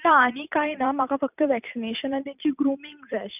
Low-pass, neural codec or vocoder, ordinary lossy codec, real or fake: 3.6 kHz; vocoder, 44.1 kHz, 128 mel bands every 256 samples, BigVGAN v2; none; fake